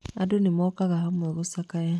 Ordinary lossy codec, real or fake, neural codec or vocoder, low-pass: none; real; none; none